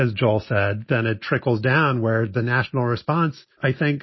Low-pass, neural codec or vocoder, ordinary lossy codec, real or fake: 7.2 kHz; none; MP3, 24 kbps; real